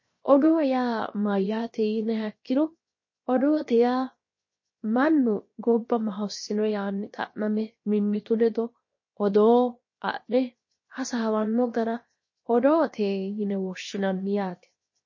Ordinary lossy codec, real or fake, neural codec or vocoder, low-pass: MP3, 32 kbps; fake; codec, 16 kHz, 0.7 kbps, FocalCodec; 7.2 kHz